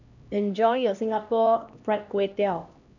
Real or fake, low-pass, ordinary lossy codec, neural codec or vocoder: fake; 7.2 kHz; none; codec, 16 kHz, 1 kbps, X-Codec, HuBERT features, trained on LibriSpeech